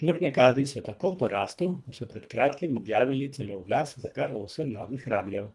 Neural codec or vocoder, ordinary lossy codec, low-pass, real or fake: codec, 24 kHz, 1.5 kbps, HILCodec; none; none; fake